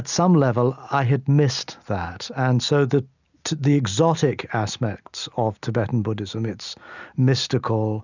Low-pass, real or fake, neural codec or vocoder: 7.2 kHz; real; none